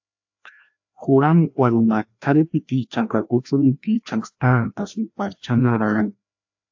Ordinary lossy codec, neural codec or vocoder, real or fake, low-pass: AAC, 48 kbps; codec, 16 kHz, 1 kbps, FreqCodec, larger model; fake; 7.2 kHz